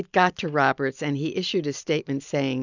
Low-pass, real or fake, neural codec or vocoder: 7.2 kHz; real; none